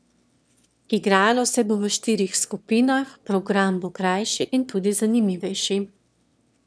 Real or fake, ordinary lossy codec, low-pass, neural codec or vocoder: fake; none; none; autoencoder, 22.05 kHz, a latent of 192 numbers a frame, VITS, trained on one speaker